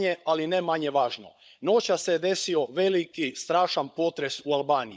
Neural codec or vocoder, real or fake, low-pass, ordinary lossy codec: codec, 16 kHz, 16 kbps, FunCodec, trained on LibriTTS, 50 frames a second; fake; none; none